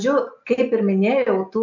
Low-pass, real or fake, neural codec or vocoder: 7.2 kHz; real; none